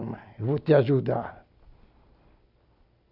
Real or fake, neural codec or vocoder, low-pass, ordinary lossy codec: real; none; 5.4 kHz; none